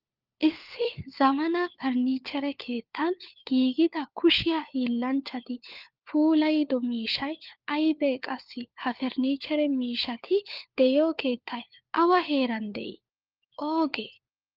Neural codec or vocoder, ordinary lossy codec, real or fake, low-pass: codec, 16 kHz, 4 kbps, FunCodec, trained on LibriTTS, 50 frames a second; Opus, 32 kbps; fake; 5.4 kHz